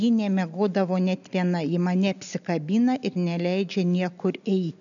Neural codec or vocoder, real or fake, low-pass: none; real; 7.2 kHz